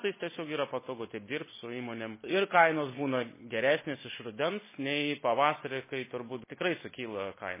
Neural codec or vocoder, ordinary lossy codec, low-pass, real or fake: none; MP3, 16 kbps; 3.6 kHz; real